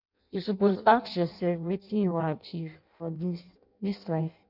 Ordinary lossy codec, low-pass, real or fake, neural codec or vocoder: none; 5.4 kHz; fake; codec, 16 kHz in and 24 kHz out, 0.6 kbps, FireRedTTS-2 codec